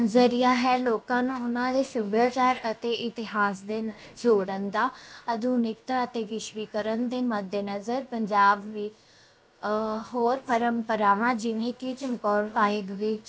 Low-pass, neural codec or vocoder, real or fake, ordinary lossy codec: none; codec, 16 kHz, about 1 kbps, DyCAST, with the encoder's durations; fake; none